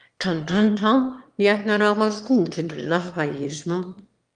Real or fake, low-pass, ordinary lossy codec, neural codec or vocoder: fake; 9.9 kHz; Opus, 32 kbps; autoencoder, 22.05 kHz, a latent of 192 numbers a frame, VITS, trained on one speaker